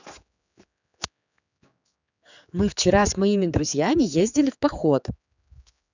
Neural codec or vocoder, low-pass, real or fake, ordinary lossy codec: codec, 16 kHz, 4 kbps, X-Codec, HuBERT features, trained on general audio; 7.2 kHz; fake; none